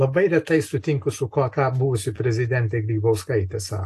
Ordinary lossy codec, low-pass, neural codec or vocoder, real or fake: AAC, 64 kbps; 14.4 kHz; none; real